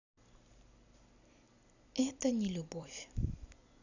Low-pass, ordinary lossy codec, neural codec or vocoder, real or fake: 7.2 kHz; none; vocoder, 44.1 kHz, 128 mel bands every 256 samples, BigVGAN v2; fake